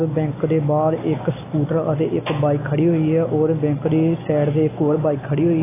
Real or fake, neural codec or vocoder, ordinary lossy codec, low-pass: real; none; AAC, 16 kbps; 3.6 kHz